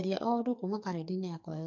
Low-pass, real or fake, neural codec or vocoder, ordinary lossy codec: 7.2 kHz; fake; codec, 44.1 kHz, 2.6 kbps, SNAC; MP3, 48 kbps